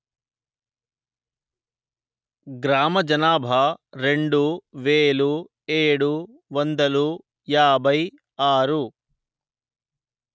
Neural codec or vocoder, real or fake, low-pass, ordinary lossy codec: none; real; none; none